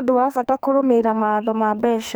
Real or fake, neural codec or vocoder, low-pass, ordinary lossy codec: fake; codec, 44.1 kHz, 2.6 kbps, SNAC; none; none